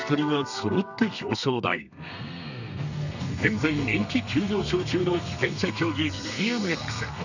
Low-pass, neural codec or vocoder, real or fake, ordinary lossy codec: 7.2 kHz; codec, 32 kHz, 1.9 kbps, SNAC; fake; none